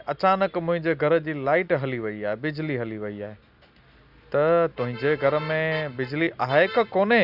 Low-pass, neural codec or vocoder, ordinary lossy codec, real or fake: 5.4 kHz; none; Opus, 64 kbps; real